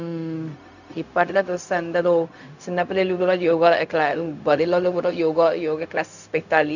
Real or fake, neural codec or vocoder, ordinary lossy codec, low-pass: fake; codec, 16 kHz, 0.4 kbps, LongCat-Audio-Codec; none; 7.2 kHz